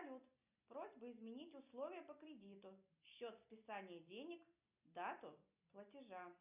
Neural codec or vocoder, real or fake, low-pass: none; real; 3.6 kHz